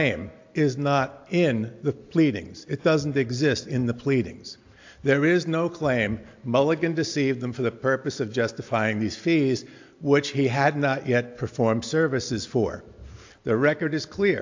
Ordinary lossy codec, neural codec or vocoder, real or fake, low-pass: AAC, 48 kbps; none; real; 7.2 kHz